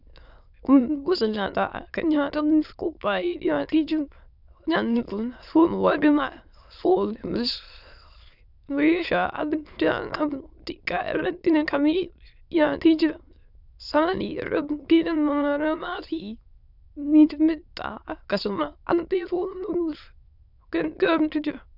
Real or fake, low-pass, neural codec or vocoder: fake; 5.4 kHz; autoencoder, 22.05 kHz, a latent of 192 numbers a frame, VITS, trained on many speakers